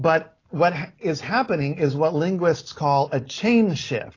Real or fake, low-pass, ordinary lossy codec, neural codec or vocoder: real; 7.2 kHz; AAC, 32 kbps; none